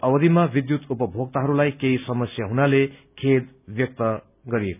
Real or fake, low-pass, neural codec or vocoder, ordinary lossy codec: real; 3.6 kHz; none; none